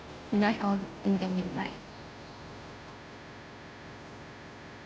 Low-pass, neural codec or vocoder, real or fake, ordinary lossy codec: none; codec, 16 kHz, 0.5 kbps, FunCodec, trained on Chinese and English, 25 frames a second; fake; none